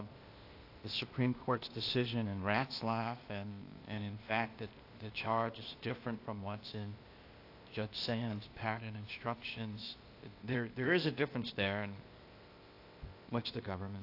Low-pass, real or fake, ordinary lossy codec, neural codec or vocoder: 5.4 kHz; fake; AAC, 32 kbps; codec, 16 kHz, 0.8 kbps, ZipCodec